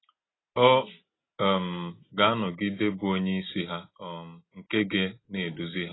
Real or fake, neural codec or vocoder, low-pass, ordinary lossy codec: real; none; 7.2 kHz; AAC, 16 kbps